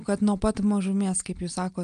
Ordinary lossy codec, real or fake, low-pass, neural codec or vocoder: Opus, 32 kbps; real; 9.9 kHz; none